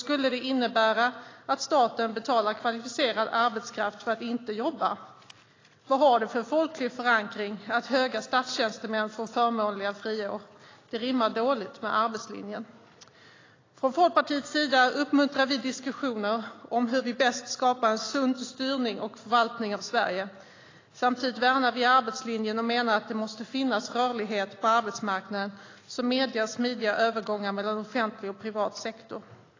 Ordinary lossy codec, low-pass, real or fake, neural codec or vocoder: AAC, 32 kbps; 7.2 kHz; real; none